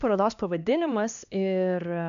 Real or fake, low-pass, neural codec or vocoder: fake; 7.2 kHz; codec, 16 kHz, 2 kbps, X-Codec, HuBERT features, trained on LibriSpeech